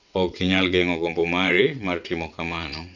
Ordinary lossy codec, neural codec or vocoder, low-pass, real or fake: none; vocoder, 22.05 kHz, 80 mel bands, WaveNeXt; 7.2 kHz; fake